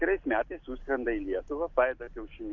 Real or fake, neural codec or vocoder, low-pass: real; none; 7.2 kHz